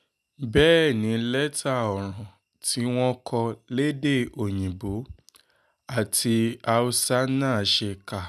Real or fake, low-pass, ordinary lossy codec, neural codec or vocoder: real; 14.4 kHz; none; none